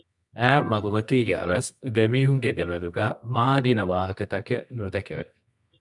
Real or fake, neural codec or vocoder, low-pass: fake; codec, 24 kHz, 0.9 kbps, WavTokenizer, medium music audio release; 10.8 kHz